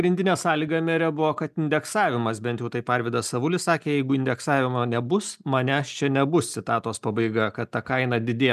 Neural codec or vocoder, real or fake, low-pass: none; real; 14.4 kHz